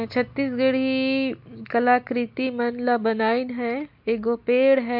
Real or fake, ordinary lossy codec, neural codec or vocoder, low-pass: real; MP3, 48 kbps; none; 5.4 kHz